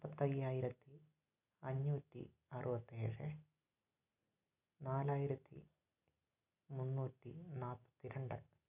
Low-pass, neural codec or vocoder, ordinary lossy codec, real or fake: 3.6 kHz; none; none; real